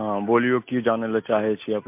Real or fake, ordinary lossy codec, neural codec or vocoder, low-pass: real; MP3, 32 kbps; none; 3.6 kHz